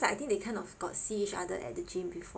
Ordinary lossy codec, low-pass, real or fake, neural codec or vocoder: none; none; real; none